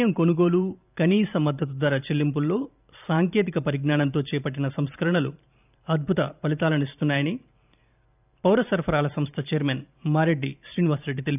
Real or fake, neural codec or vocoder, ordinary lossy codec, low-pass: fake; vocoder, 44.1 kHz, 128 mel bands every 512 samples, BigVGAN v2; none; 3.6 kHz